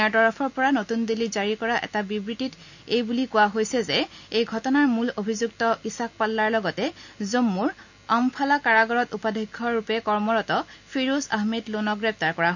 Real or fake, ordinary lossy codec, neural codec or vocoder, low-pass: real; none; none; 7.2 kHz